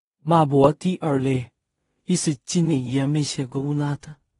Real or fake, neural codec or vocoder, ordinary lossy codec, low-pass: fake; codec, 16 kHz in and 24 kHz out, 0.4 kbps, LongCat-Audio-Codec, two codebook decoder; AAC, 32 kbps; 10.8 kHz